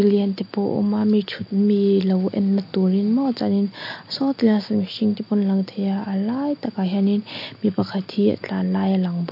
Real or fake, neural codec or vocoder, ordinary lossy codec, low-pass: real; none; MP3, 32 kbps; 5.4 kHz